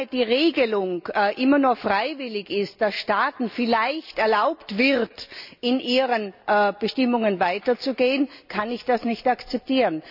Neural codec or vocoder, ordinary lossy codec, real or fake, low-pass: none; none; real; 5.4 kHz